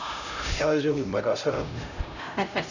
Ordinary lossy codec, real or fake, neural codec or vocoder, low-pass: none; fake; codec, 16 kHz, 0.5 kbps, X-Codec, HuBERT features, trained on LibriSpeech; 7.2 kHz